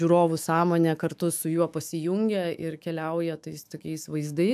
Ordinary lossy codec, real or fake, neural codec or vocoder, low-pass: AAC, 96 kbps; fake; autoencoder, 48 kHz, 128 numbers a frame, DAC-VAE, trained on Japanese speech; 14.4 kHz